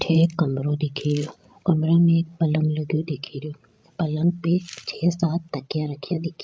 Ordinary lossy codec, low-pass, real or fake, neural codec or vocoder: none; none; fake; codec, 16 kHz, 16 kbps, FreqCodec, larger model